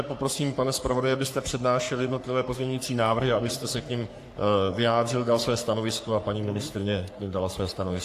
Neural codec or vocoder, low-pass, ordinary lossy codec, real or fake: codec, 44.1 kHz, 3.4 kbps, Pupu-Codec; 14.4 kHz; AAC, 64 kbps; fake